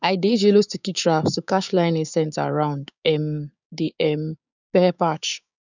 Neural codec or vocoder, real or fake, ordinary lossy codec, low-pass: codec, 16 kHz, 6 kbps, DAC; fake; none; 7.2 kHz